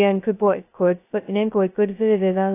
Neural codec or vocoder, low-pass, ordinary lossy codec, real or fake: codec, 16 kHz, 0.2 kbps, FocalCodec; 3.6 kHz; AAC, 24 kbps; fake